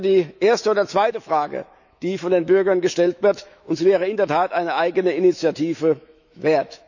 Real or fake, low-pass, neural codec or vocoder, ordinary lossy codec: fake; 7.2 kHz; autoencoder, 48 kHz, 128 numbers a frame, DAC-VAE, trained on Japanese speech; none